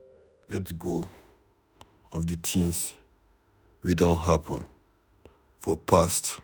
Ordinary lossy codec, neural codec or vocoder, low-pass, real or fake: none; autoencoder, 48 kHz, 32 numbers a frame, DAC-VAE, trained on Japanese speech; none; fake